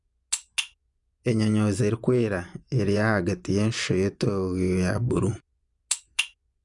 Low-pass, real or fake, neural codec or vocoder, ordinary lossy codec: 10.8 kHz; fake; vocoder, 24 kHz, 100 mel bands, Vocos; none